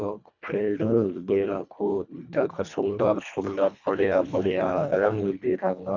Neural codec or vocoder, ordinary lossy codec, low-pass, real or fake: codec, 24 kHz, 1.5 kbps, HILCodec; none; 7.2 kHz; fake